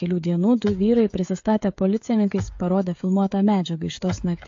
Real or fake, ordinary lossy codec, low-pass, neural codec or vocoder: fake; AAC, 48 kbps; 7.2 kHz; codec, 16 kHz, 16 kbps, FreqCodec, smaller model